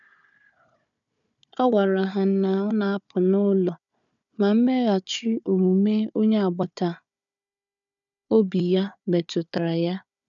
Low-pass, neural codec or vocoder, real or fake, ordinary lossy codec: 7.2 kHz; codec, 16 kHz, 4 kbps, FunCodec, trained on Chinese and English, 50 frames a second; fake; none